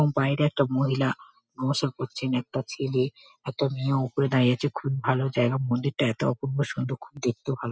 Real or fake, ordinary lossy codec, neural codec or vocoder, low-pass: real; none; none; none